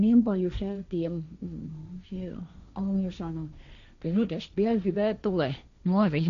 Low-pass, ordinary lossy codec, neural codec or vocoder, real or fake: 7.2 kHz; none; codec, 16 kHz, 1.1 kbps, Voila-Tokenizer; fake